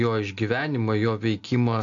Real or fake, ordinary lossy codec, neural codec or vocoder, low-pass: real; AAC, 48 kbps; none; 7.2 kHz